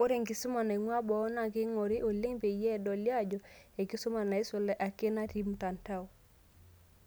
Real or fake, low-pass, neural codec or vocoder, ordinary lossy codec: real; none; none; none